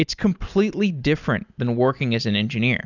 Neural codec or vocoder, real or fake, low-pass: none; real; 7.2 kHz